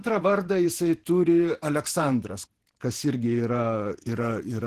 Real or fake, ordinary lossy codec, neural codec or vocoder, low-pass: fake; Opus, 16 kbps; vocoder, 48 kHz, 128 mel bands, Vocos; 14.4 kHz